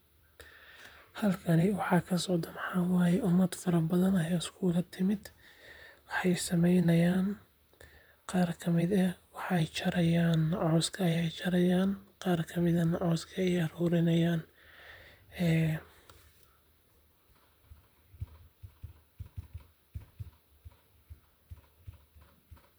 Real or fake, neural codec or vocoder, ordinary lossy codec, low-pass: fake; vocoder, 44.1 kHz, 128 mel bands, Pupu-Vocoder; none; none